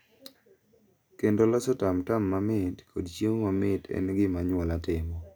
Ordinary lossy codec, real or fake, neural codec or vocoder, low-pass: none; real; none; none